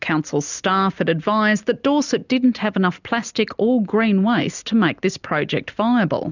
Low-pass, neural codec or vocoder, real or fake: 7.2 kHz; none; real